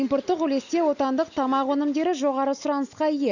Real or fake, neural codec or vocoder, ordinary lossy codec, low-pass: real; none; none; 7.2 kHz